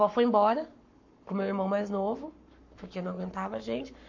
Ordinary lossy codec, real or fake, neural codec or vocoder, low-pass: MP3, 48 kbps; fake; codec, 44.1 kHz, 7.8 kbps, Pupu-Codec; 7.2 kHz